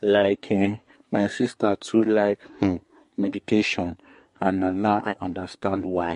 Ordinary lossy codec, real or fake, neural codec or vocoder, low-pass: MP3, 48 kbps; fake; codec, 24 kHz, 1 kbps, SNAC; 10.8 kHz